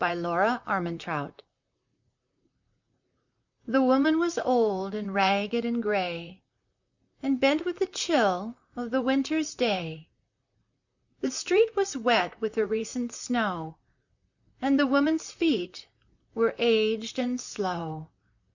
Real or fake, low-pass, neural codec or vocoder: fake; 7.2 kHz; vocoder, 44.1 kHz, 128 mel bands, Pupu-Vocoder